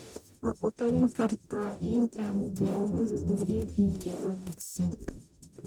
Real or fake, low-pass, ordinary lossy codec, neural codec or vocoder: fake; none; none; codec, 44.1 kHz, 0.9 kbps, DAC